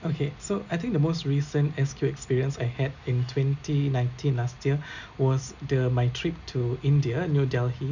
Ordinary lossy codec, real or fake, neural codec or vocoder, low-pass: none; real; none; 7.2 kHz